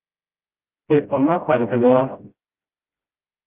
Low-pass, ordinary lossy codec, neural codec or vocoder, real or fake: 3.6 kHz; Opus, 24 kbps; codec, 16 kHz, 0.5 kbps, FreqCodec, smaller model; fake